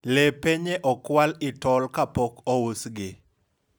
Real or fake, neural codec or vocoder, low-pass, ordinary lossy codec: real; none; none; none